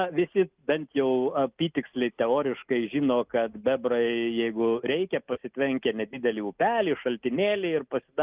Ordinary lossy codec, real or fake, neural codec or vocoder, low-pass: Opus, 24 kbps; real; none; 3.6 kHz